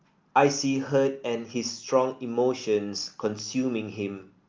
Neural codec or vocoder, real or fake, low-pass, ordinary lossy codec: none; real; 7.2 kHz; Opus, 32 kbps